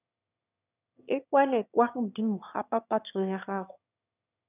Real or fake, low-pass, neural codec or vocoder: fake; 3.6 kHz; autoencoder, 22.05 kHz, a latent of 192 numbers a frame, VITS, trained on one speaker